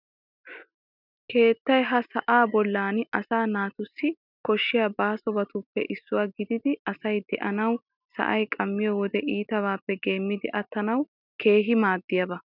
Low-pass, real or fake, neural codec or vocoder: 5.4 kHz; real; none